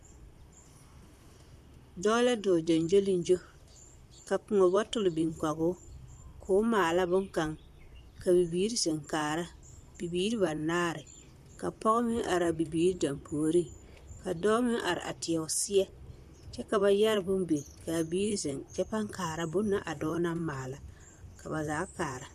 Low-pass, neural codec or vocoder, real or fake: 14.4 kHz; vocoder, 44.1 kHz, 128 mel bands, Pupu-Vocoder; fake